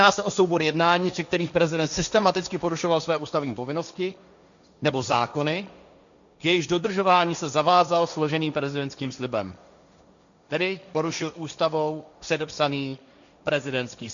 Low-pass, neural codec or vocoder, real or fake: 7.2 kHz; codec, 16 kHz, 1.1 kbps, Voila-Tokenizer; fake